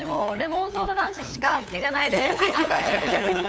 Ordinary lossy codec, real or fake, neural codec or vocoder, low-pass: none; fake; codec, 16 kHz, 8 kbps, FunCodec, trained on LibriTTS, 25 frames a second; none